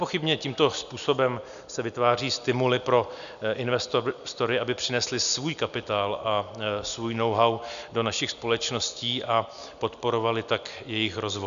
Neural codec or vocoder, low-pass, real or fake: none; 7.2 kHz; real